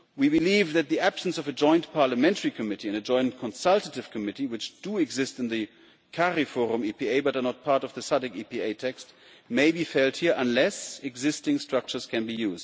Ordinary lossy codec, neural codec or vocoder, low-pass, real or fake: none; none; none; real